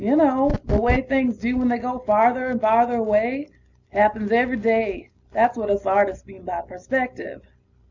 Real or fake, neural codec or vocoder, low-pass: real; none; 7.2 kHz